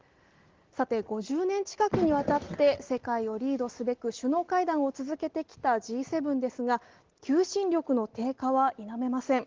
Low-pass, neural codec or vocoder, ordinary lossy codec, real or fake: 7.2 kHz; none; Opus, 16 kbps; real